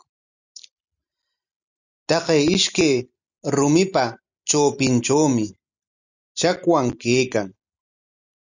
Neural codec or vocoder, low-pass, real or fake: none; 7.2 kHz; real